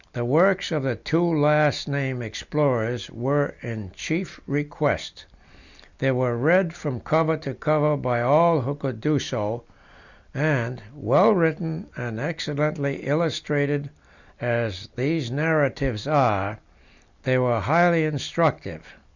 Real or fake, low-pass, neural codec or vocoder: real; 7.2 kHz; none